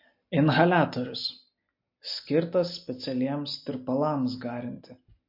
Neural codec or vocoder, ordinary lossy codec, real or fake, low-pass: none; MP3, 32 kbps; real; 5.4 kHz